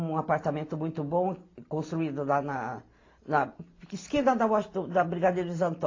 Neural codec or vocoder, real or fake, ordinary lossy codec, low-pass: none; real; AAC, 32 kbps; 7.2 kHz